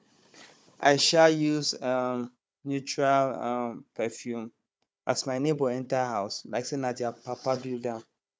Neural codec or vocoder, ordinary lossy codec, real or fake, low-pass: codec, 16 kHz, 4 kbps, FunCodec, trained on Chinese and English, 50 frames a second; none; fake; none